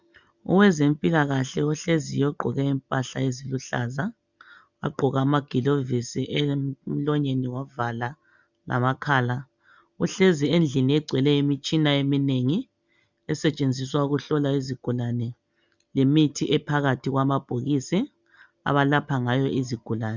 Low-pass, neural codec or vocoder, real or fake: 7.2 kHz; none; real